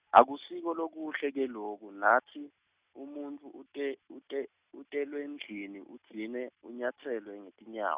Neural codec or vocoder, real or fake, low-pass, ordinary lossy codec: none; real; 3.6 kHz; Opus, 64 kbps